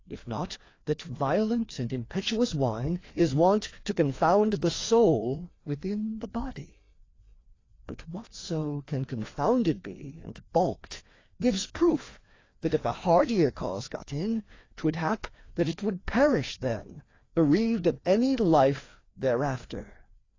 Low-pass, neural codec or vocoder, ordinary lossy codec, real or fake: 7.2 kHz; codec, 16 kHz, 2 kbps, FreqCodec, larger model; AAC, 32 kbps; fake